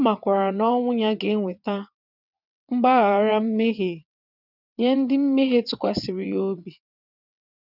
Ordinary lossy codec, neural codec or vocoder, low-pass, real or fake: none; vocoder, 22.05 kHz, 80 mel bands, WaveNeXt; 5.4 kHz; fake